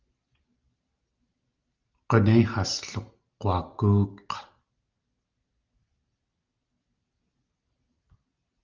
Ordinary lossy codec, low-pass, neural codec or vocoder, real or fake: Opus, 32 kbps; 7.2 kHz; none; real